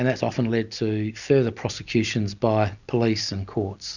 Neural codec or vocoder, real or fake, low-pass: none; real; 7.2 kHz